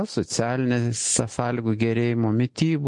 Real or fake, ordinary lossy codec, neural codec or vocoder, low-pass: real; MP3, 48 kbps; none; 10.8 kHz